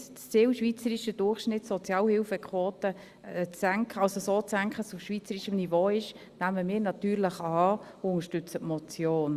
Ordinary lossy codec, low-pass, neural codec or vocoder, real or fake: Opus, 64 kbps; 14.4 kHz; none; real